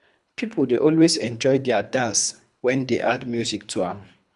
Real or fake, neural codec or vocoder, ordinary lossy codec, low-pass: fake; codec, 24 kHz, 3 kbps, HILCodec; none; 10.8 kHz